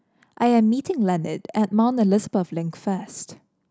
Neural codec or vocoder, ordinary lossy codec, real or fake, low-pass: none; none; real; none